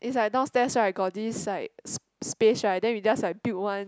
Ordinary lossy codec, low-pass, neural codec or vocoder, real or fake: none; none; none; real